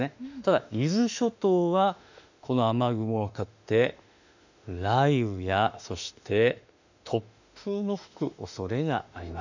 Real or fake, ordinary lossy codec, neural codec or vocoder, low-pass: fake; none; autoencoder, 48 kHz, 32 numbers a frame, DAC-VAE, trained on Japanese speech; 7.2 kHz